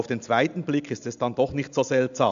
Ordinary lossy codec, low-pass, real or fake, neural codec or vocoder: AAC, 96 kbps; 7.2 kHz; real; none